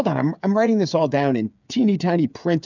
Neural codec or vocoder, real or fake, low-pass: codec, 16 kHz, 8 kbps, FreqCodec, smaller model; fake; 7.2 kHz